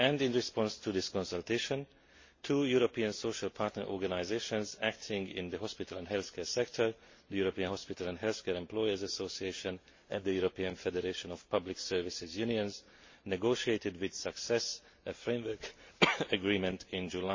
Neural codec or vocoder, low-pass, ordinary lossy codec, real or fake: none; 7.2 kHz; MP3, 32 kbps; real